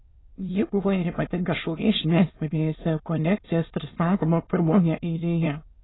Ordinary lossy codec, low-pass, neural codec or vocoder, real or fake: AAC, 16 kbps; 7.2 kHz; autoencoder, 22.05 kHz, a latent of 192 numbers a frame, VITS, trained on many speakers; fake